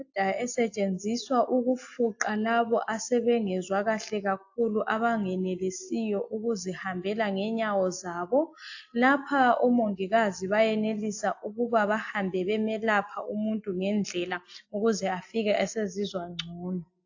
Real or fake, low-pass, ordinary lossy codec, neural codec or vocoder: real; 7.2 kHz; AAC, 48 kbps; none